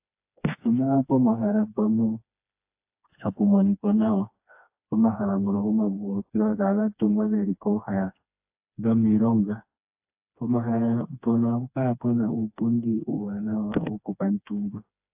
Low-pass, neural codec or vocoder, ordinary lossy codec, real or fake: 3.6 kHz; codec, 16 kHz, 2 kbps, FreqCodec, smaller model; AAC, 32 kbps; fake